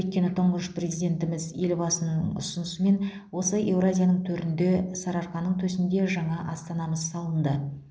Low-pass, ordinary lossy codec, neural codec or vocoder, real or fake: none; none; none; real